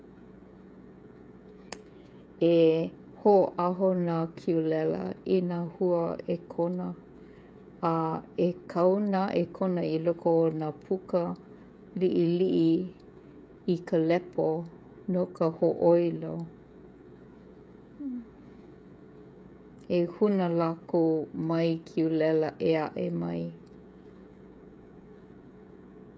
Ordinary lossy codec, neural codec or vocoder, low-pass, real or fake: none; codec, 16 kHz, 16 kbps, FreqCodec, smaller model; none; fake